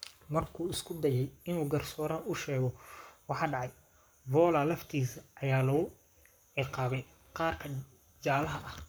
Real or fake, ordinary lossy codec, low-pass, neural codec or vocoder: fake; none; none; codec, 44.1 kHz, 7.8 kbps, Pupu-Codec